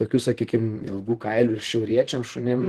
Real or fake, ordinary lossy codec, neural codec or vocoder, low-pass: fake; Opus, 16 kbps; vocoder, 44.1 kHz, 128 mel bands, Pupu-Vocoder; 14.4 kHz